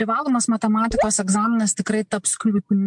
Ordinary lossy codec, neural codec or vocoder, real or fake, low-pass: MP3, 64 kbps; none; real; 10.8 kHz